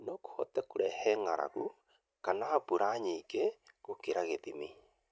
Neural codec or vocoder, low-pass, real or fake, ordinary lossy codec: none; none; real; none